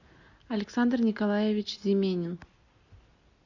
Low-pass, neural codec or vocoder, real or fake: 7.2 kHz; none; real